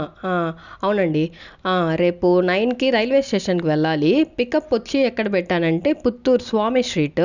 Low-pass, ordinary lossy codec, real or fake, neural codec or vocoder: 7.2 kHz; none; real; none